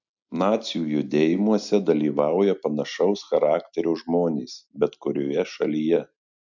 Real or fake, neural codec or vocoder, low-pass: real; none; 7.2 kHz